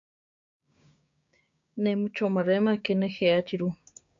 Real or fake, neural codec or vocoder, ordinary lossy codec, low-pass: fake; codec, 16 kHz, 6 kbps, DAC; AAC, 48 kbps; 7.2 kHz